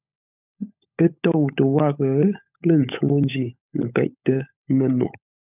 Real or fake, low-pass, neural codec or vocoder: fake; 3.6 kHz; codec, 16 kHz, 16 kbps, FunCodec, trained on LibriTTS, 50 frames a second